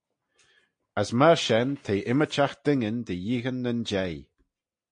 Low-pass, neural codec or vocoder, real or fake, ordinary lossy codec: 9.9 kHz; none; real; MP3, 48 kbps